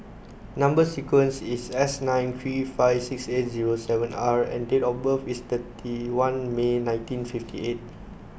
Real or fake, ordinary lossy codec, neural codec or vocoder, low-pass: real; none; none; none